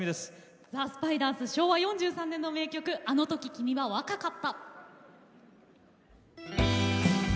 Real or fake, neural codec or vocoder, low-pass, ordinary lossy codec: real; none; none; none